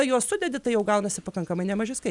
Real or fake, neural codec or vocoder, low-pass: real; none; 10.8 kHz